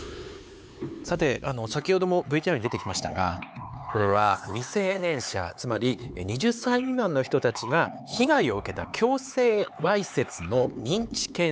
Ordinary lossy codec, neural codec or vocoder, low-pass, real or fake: none; codec, 16 kHz, 4 kbps, X-Codec, HuBERT features, trained on LibriSpeech; none; fake